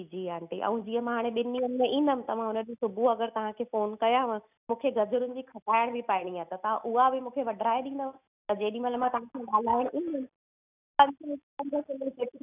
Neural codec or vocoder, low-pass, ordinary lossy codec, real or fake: none; 3.6 kHz; none; real